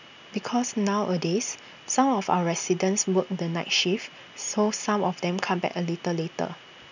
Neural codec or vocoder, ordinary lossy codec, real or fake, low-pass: none; none; real; 7.2 kHz